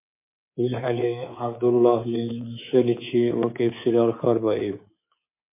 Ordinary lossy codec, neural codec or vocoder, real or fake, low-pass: MP3, 24 kbps; codec, 24 kHz, 3.1 kbps, DualCodec; fake; 3.6 kHz